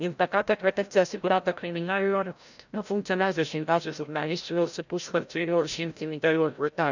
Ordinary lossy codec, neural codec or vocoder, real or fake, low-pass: none; codec, 16 kHz, 0.5 kbps, FreqCodec, larger model; fake; 7.2 kHz